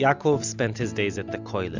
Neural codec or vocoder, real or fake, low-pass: none; real; 7.2 kHz